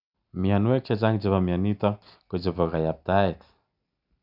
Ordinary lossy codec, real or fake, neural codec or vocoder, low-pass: none; real; none; 5.4 kHz